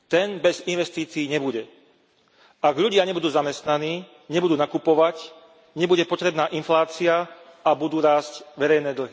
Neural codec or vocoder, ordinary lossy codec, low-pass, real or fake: none; none; none; real